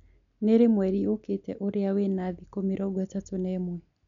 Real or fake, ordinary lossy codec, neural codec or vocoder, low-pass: real; none; none; 7.2 kHz